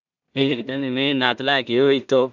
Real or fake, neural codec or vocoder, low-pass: fake; codec, 16 kHz in and 24 kHz out, 0.4 kbps, LongCat-Audio-Codec, two codebook decoder; 7.2 kHz